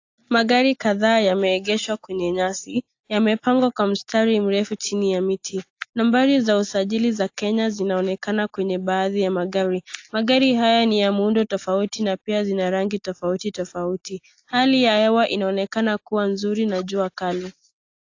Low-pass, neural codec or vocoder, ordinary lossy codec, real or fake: 7.2 kHz; none; AAC, 48 kbps; real